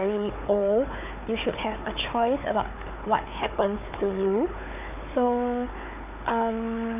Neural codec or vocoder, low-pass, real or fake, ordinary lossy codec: codec, 16 kHz, 4 kbps, FunCodec, trained on Chinese and English, 50 frames a second; 3.6 kHz; fake; none